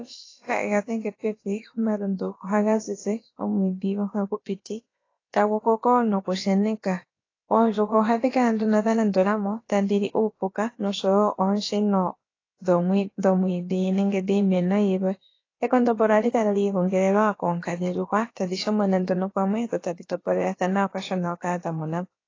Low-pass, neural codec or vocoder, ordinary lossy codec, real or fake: 7.2 kHz; codec, 16 kHz, about 1 kbps, DyCAST, with the encoder's durations; AAC, 32 kbps; fake